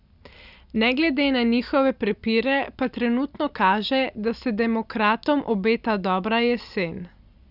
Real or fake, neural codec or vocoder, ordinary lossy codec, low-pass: real; none; none; 5.4 kHz